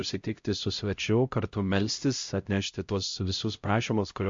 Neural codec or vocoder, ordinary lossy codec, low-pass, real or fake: codec, 16 kHz, 0.5 kbps, X-Codec, HuBERT features, trained on LibriSpeech; MP3, 48 kbps; 7.2 kHz; fake